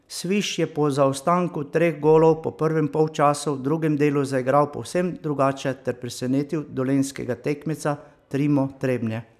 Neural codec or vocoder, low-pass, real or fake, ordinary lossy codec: none; 14.4 kHz; real; none